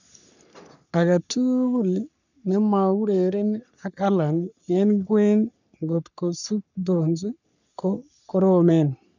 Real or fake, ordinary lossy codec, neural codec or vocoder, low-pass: fake; none; codec, 44.1 kHz, 3.4 kbps, Pupu-Codec; 7.2 kHz